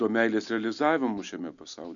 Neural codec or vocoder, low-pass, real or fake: none; 7.2 kHz; real